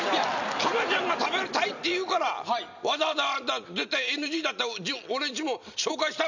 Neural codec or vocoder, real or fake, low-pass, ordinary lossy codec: none; real; 7.2 kHz; none